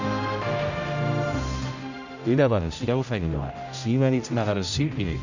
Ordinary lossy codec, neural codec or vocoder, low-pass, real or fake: none; codec, 16 kHz, 0.5 kbps, X-Codec, HuBERT features, trained on general audio; 7.2 kHz; fake